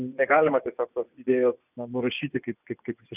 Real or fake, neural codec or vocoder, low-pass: fake; vocoder, 24 kHz, 100 mel bands, Vocos; 3.6 kHz